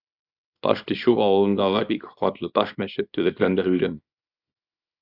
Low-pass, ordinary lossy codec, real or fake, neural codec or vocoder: 5.4 kHz; Opus, 64 kbps; fake; codec, 24 kHz, 0.9 kbps, WavTokenizer, small release